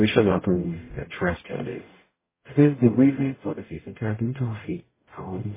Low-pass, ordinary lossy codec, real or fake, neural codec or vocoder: 3.6 kHz; MP3, 16 kbps; fake; codec, 44.1 kHz, 0.9 kbps, DAC